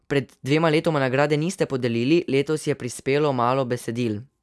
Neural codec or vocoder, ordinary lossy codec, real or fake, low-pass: none; none; real; none